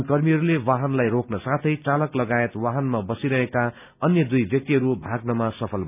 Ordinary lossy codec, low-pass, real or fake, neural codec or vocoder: none; 3.6 kHz; real; none